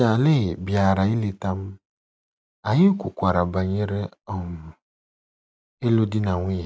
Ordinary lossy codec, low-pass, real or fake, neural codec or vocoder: none; none; real; none